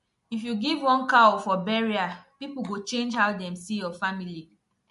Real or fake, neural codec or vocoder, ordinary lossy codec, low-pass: real; none; MP3, 48 kbps; 14.4 kHz